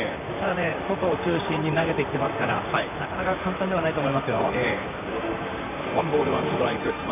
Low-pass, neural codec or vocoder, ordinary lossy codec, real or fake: 3.6 kHz; vocoder, 44.1 kHz, 128 mel bands, Pupu-Vocoder; none; fake